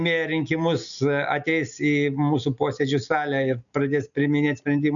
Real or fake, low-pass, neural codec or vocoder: real; 7.2 kHz; none